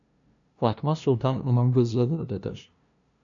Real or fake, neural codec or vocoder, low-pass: fake; codec, 16 kHz, 0.5 kbps, FunCodec, trained on LibriTTS, 25 frames a second; 7.2 kHz